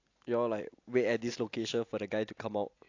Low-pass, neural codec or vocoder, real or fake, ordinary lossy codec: 7.2 kHz; none; real; AAC, 48 kbps